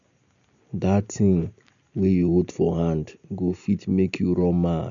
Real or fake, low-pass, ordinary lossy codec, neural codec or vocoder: real; 7.2 kHz; none; none